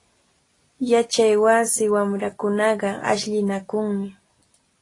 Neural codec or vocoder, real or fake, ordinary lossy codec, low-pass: none; real; AAC, 32 kbps; 10.8 kHz